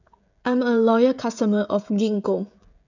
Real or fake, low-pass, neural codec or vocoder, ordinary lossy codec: fake; 7.2 kHz; codec, 16 kHz, 16 kbps, FreqCodec, smaller model; none